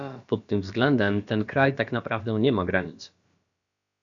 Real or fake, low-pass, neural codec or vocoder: fake; 7.2 kHz; codec, 16 kHz, about 1 kbps, DyCAST, with the encoder's durations